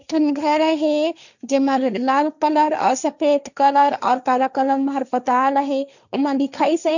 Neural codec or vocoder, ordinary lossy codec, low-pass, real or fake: codec, 16 kHz, 1.1 kbps, Voila-Tokenizer; none; 7.2 kHz; fake